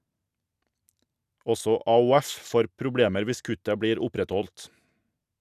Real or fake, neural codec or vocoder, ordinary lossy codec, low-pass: real; none; none; 14.4 kHz